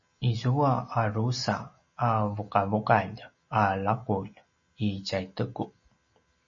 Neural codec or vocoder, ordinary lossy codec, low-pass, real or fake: none; MP3, 32 kbps; 7.2 kHz; real